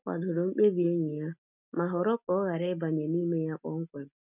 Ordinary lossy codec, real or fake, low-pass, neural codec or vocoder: none; real; 3.6 kHz; none